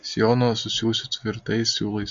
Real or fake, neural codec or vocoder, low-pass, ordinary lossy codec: real; none; 7.2 kHz; MP3, 48 kbps